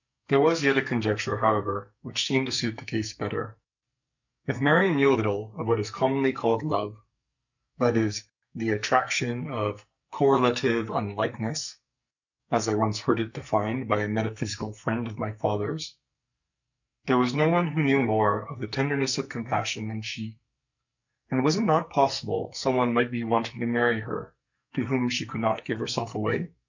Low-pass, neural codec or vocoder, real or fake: 7.2 kHz; codec, 44.1 kHz, 2.6 kbps, SNAC; fake